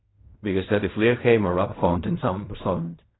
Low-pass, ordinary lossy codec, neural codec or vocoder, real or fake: 7.2 kHz; AAC, 16 kbps; codec, 16 kHz in and 24 kHz out, 0.4 kbps, LongCat-Audio-Codec, fine tuned four codebook decoder; fake